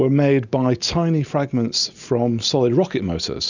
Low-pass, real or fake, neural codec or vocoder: 7.2 kHz; real; none